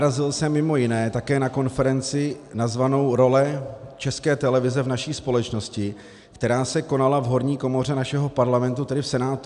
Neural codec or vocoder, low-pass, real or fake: none; 10.8 kHz; real